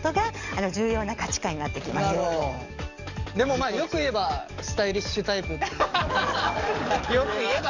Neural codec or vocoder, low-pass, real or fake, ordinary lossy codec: codec, 44.1 kHz, 7.8 kbps, DAC; 7.2 kHz; fake; none